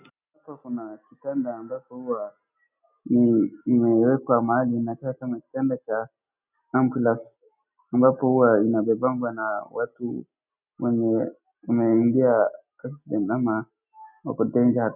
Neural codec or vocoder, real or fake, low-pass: none; real; 3.6 kHz